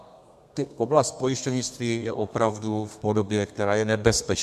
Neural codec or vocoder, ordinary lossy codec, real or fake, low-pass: codec, 32 kHz, 1.9 kbps, SNAC; MP3, 96 kbps; fake; 14.4 kHz